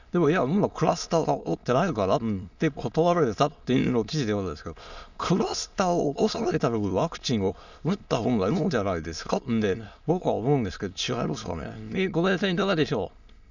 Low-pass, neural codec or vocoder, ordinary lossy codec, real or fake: 7.2 kHz; autoencoder, 22.05 kHz, a latent of 192 numbers a frame, VITS, trained on many speakers; none; fake